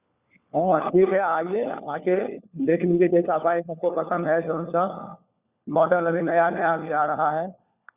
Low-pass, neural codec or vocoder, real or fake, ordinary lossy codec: 3.6 kHz; codec, 16 kHz, 4 kbps, FunCodec, trained on LibriTTS, 50 frames a second; fake; Opus, 64 kbps